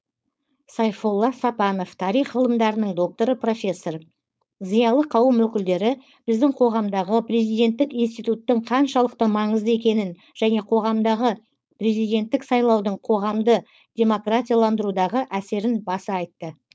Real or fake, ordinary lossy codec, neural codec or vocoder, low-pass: fake; none; codec, 16 kHz, 4.8 kbps, FACodec; none